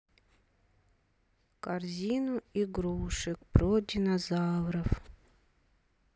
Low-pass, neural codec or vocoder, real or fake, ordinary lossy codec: none; none; real; none